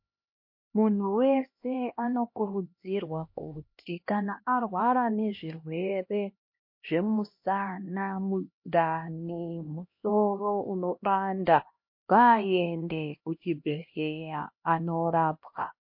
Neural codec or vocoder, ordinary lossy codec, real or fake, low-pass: codec, 16 kHz, 1 kbps, X-Codec, HuBERT features, trained on LibriSpeech; MP3, 32 kbps; fake; 5.4 kHz